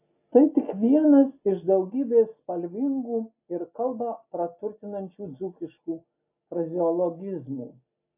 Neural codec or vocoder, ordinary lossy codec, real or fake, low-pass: none; AAC, 32 kbps; real; 3.6 kHz